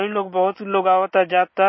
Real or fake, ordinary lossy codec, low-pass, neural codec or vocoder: real; MP3, 24 kbps; 7.2 kHz; none